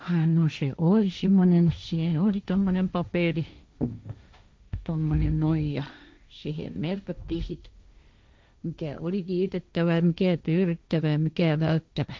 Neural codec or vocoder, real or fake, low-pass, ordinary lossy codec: codec, 16 kHz, 1.1 kbps, Voila-Tokenizer; fake; none; none